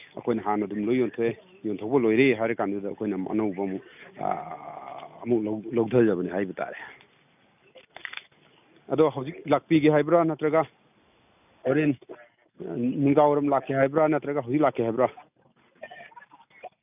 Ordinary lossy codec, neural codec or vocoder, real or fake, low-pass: none; none; real; 3.6 kHz